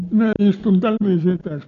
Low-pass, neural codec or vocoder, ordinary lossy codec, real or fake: 7.2 kHz; none; none; real